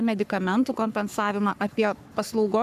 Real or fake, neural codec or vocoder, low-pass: fake; codec, 44.1 kHz, 3.4 kbps, Pupu-Codec; 14.4 kHz